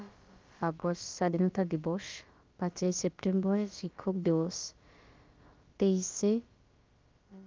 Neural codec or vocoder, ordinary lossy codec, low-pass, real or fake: codec, 16 kHz, about 1 kbps, DyCAST, with the encoder's durations; Opus, 24 kbps; 7.2 kHz; fake